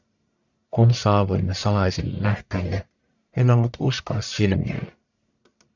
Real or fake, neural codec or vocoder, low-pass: fake; codec, 44.1 kHz, 1.7 kbps, Pupu-Codec; 7.2 kHz